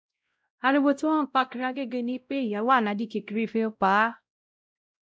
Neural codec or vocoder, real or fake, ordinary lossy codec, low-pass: codec, 16 kHz, 0.5 kbps, X-Codec, WavLM features, trained on Multilingual LibriSpeech; fake; none; none